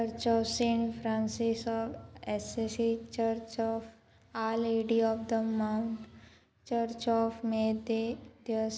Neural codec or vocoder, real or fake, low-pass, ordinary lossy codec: none; real; none; none